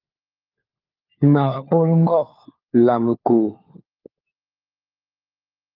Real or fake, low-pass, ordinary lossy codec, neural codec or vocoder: fake; 5.4 kHz; Opus, 24 kbps; codec, 16 kHz, 4 kbps, FunCodec, trained on LibriTTS, 50 frames a second